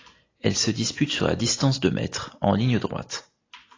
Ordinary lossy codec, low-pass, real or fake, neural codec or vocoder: AAC, 32 kbps; 7.2 kHz; real; none